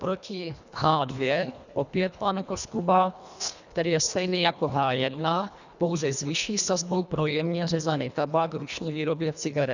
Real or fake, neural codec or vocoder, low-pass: fake; codec, 24 kHz, 1.5 kbps, HILCodec; 7.2 kHz